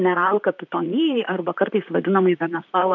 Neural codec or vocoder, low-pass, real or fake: vocoder, 44.1 kHz, 128 mel bands, Pupu-Vocoder; 7.2 kHz; fake